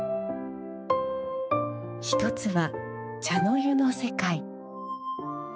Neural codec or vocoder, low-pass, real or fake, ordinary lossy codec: codec, 16 kHz, 4 kbps, X-Codec, HuBERT features, trained on balanced general audio; none; fake; none